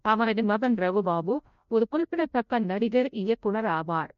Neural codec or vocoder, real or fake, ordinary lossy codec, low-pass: codec, 16 kHz, 0.5 kbps, FreqCodec, larger model; fake; MP3, 48 kbps; 7.2 kHz